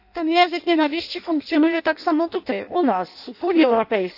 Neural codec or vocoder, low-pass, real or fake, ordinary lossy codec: codec, 16 kHz in and 24 kHz out, 0.6 kbps, FireRedTTS-2 codec; 5.4 kHz; fake; none